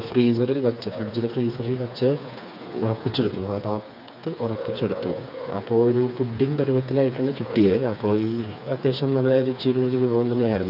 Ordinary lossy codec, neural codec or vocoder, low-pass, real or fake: none; codec, 16 kHz, 4 kbps, FreqCodec, smaller model; 5.4 kHz; fake